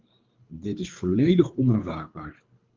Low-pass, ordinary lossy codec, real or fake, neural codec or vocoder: 7.2 kHz; Opus, 24 kbps; fake; codec, 24 kHz, 3 kbps, HILCodec